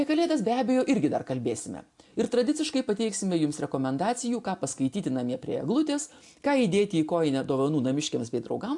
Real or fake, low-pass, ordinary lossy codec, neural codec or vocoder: real; 10.8 kHz; AAC, 64 kbps; none